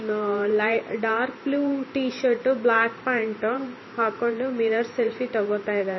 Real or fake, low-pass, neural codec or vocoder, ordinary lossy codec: fake; 7.2 kHz; vocoder, 44.1 kHz, 128 mel bands every 512 samples, BigVGAN v2; MP3, 24 kbps